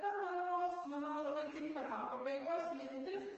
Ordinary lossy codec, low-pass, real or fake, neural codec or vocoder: Opus, 24 kbps; 7.2 kHz; fake; codec, 16 kHz, 2 kbps, FreqCodec, smaller model